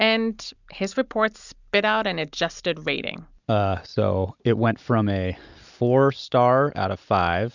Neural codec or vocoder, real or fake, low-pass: none; real; 7.2 kHz